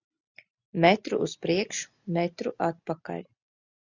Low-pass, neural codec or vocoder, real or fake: 7.2 kHz; none; real